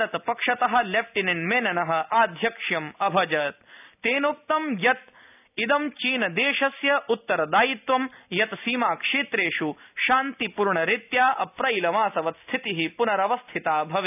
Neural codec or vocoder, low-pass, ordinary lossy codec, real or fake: none; 3.6 kHz; none; real